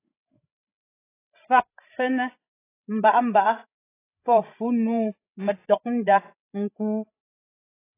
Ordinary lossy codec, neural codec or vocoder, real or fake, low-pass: AAC, 24 kbps; codec, 16 kHz, 16 kbps, FreqCodec, larger model; fake; 3.6 kHz